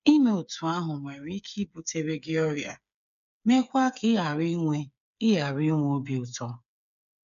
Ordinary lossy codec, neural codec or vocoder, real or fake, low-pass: none; codec, 16 kHz, 8 kbps, FreqCodec, smaller model; fake; 7.2 kHz